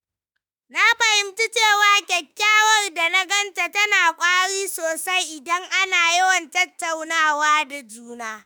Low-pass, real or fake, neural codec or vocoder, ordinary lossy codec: none; fake; autoencoder, 48 kHz, 32 numbers a frame, DAC-VAE, trained on Japanese speech; none